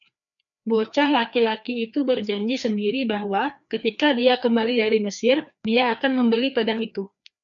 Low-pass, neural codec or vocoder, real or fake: 7.2 kHz; codec, 16 kHz, 2 kbps, FreqCodec, larger model; fake